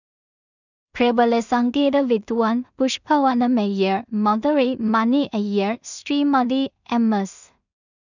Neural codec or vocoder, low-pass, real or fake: codec, 16 kHz in and 24 kHz out, 0.4 kbps, LongCat-Audio-Codec, two codebook decoder; 7.2 kHz; fake